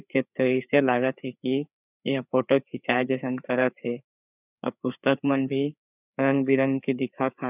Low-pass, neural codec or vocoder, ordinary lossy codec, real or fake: 3.6 kHz; codec, 16 kHz, 4 kbps, FreqCodec, larger model; none; fake